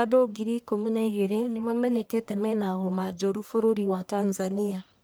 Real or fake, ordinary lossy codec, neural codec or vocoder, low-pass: fake; none; codec, 44.1 kHz, 1.7 kbps, Pupu-Codec; none